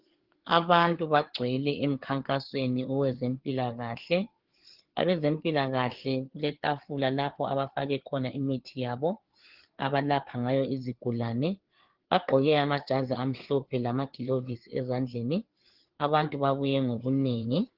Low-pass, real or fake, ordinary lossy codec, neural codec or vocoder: 5.4 kHz; fake; Opus, 16 kbps; codec, 16 kHz, 4 kbps, FreqCodec, larger model